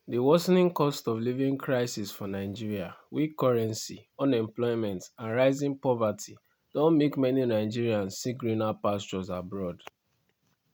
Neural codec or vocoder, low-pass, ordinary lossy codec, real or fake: none; 19.8 kHz; none; real